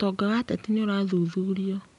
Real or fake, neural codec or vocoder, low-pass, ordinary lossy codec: real; none; 10.8 kHz; none